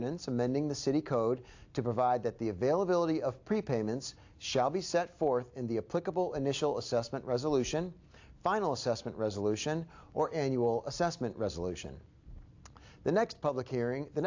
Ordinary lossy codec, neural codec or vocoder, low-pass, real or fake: AAC, 48 kbps; none; 7.2 kHz; real